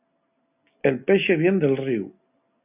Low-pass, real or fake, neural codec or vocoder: 3.6 kHz; real; none